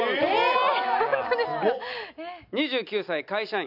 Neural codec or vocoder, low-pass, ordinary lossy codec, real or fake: none; 5.4 kHz; none; real